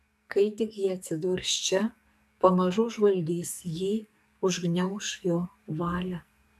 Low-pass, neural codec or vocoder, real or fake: 14.4 kHz; codec, 44.1 kHz, 2.6 kbps, SNAC; fake